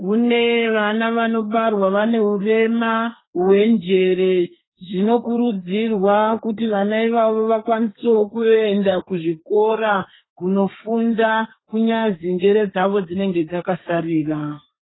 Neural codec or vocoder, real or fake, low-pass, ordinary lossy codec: codec, 32 kHz, 1.9 kbps, SNAC; fake; 7.2 kHz; AAC, 16 kbps